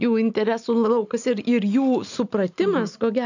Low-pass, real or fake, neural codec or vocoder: 7.2 kHz; real; none